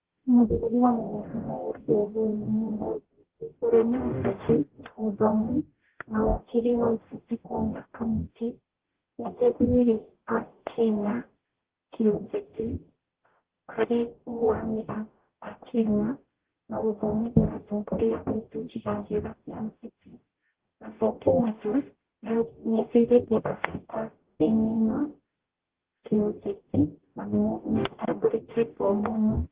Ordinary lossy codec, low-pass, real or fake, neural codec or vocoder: Opus, 16 kbps; 3.6 kHz; fake; codec, 44.1 kHz, 0.9 kbps, DAC